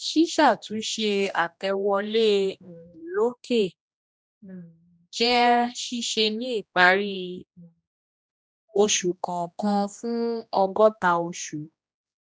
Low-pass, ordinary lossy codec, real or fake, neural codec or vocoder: none; none; fake; codec, 16 kHz, 1 kbps, X-Codec, HuBERT features, trained on general audio